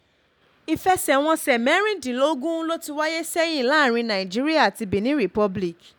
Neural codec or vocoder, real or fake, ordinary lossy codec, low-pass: none; real; none; none